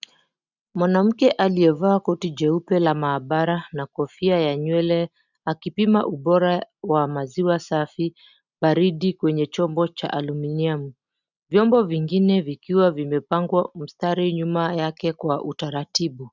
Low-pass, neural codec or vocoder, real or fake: 7.2 kHz; none; real